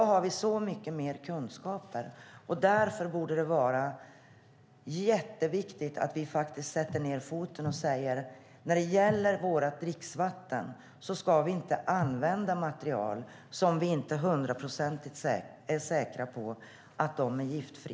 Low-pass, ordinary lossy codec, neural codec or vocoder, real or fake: none; none; none; real